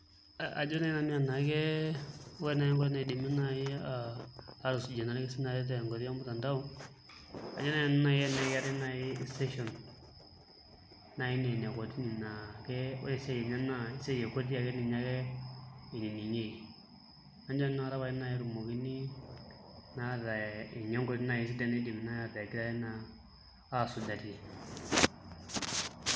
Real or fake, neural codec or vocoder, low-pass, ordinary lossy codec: real; none; none; none